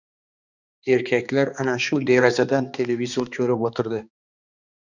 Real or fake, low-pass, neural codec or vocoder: fake; 7.2 kHz; codec, 16 kHz, 2 kbps, X-Codec, HuBERT features, trained on balanced general audio